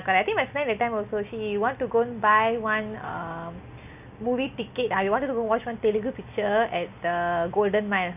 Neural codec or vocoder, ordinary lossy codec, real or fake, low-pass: none; none; real; 3.6 kHz